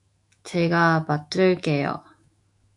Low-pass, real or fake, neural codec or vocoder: 10.8 kHz; fake; autoencoder, 48 kHz, 128 numbers a frame, DAC-VAE, trained on Japanese speech